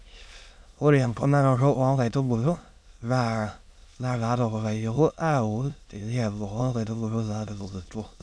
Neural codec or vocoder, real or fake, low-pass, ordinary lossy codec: autoencoder, 22.05 kHz, a latent of 192 numbers a frame, VITS, trained on many speakers; fake; none; none